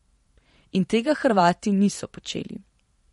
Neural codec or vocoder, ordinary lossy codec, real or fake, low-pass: vocoder, 44.1 kHz, 128 mel bands every 512 samples, BigVGAN v2; MP3, 48 kbps; fake; 19.8 kHz